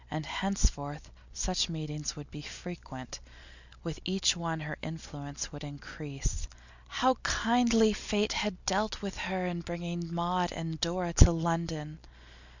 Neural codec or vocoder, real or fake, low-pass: none; real; 7.2 kHz